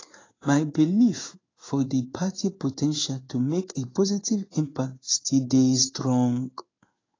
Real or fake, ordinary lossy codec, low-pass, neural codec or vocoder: fake; AAC, 32 kbps; 7.2 kHz; codec, 16 kHz in and 24 kHz out, 1 kbps, XY-Tokenizer